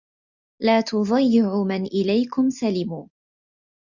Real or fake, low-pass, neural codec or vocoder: real; 7.2 kHz; none